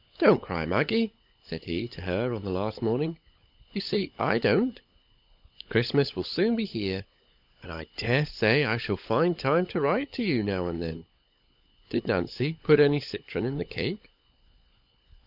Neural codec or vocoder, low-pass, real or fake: codec, 16 kHz, 16 kbps, FunCodec, trained on LibriTTS, 50 frames a second; 5.4 kHz; fake